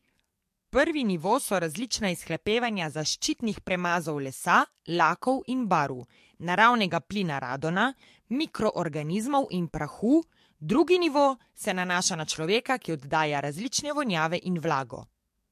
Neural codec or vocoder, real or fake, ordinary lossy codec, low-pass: codec, 44.1 kHz, 7.8 kbps, DAC; fake; MP3, 64 kbps; 14.4 kHz